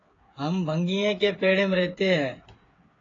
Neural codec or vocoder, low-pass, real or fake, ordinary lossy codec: codec, 16 kHz, 8 kbps, FreqCodec, smaller model; 7.2 kHz; fake; AAC, 32 kbps